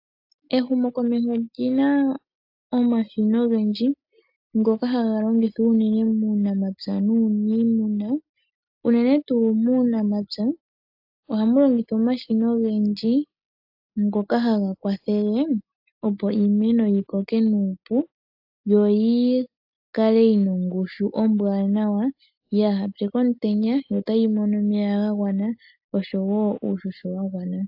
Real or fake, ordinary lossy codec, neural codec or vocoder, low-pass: real; AAC, 48 kbps; none; 5.4 kHz